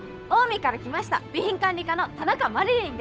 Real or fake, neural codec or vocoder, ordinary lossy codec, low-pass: fake; codec, 16 kHz, 8 kbps, FunCodec, trained on Chinese and English, 25 frames a second; none; none